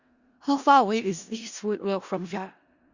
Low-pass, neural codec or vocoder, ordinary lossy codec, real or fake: 7.2 kHz; codec, 16 kHz in and 24 kHz out, 0.4 kbps, LongCat-Audio-Codec, four codebook decoder; Opus, 64 kbps; fake